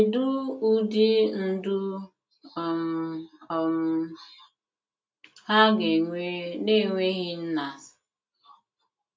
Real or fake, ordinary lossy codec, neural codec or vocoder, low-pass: real; none; none; none